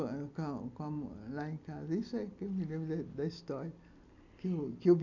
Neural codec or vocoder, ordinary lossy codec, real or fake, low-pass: none; none; real; 7.2 kHz